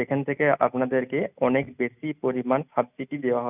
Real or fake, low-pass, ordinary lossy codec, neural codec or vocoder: real; 3.6 kHz; none; none